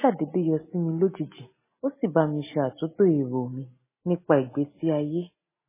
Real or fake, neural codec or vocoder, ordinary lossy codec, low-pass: real; none; MP3, 16 kbps; 3.6 kHz